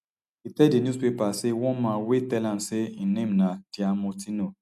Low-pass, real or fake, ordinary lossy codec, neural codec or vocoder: 14.4 kHz; real; none; none